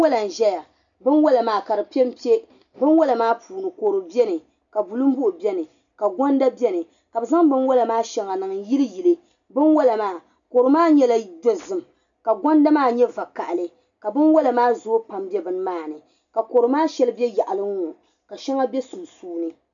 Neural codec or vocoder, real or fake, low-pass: none; real; 7.2 kHz